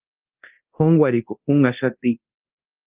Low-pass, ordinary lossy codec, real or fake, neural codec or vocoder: 3.6 kHz; Opus, 32 kbps; fake; codec, 24 kHz, 0.9 kbps, DualCodec